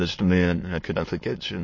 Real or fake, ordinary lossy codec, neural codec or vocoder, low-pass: fake; MP3, 32 kbps; autoencoder, 22.05 kHz, a latent of 192 numbers a frame, VITS, trained on many speakers; 7.2 kHz